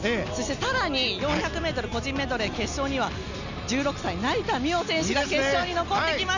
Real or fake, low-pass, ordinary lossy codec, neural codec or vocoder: real; 7.2 kHz; none; none